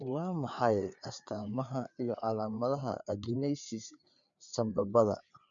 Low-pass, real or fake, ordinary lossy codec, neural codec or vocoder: 7.2 kHz; fake; none; codec, 16 kHz, 4 kbps, FreqCodec, larger model